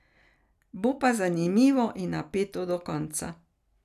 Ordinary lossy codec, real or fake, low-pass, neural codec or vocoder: none; real; 14.4 kHz; none